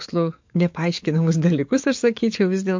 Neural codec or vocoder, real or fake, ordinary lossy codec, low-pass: codec, 44.1 kHz, 7.8 kbps, DAC; fake; MP3, 48 kbps; 7.2 kHz